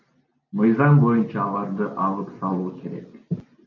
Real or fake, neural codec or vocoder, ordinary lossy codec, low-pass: real; none; MP3, 64 kbps; 7.2 kHz